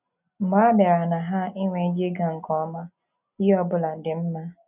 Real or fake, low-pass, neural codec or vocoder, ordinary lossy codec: real; 3.6 kHz; none; none